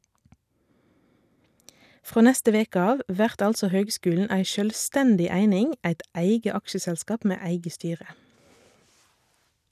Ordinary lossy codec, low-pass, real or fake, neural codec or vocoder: none; 14.4 kHz; real; none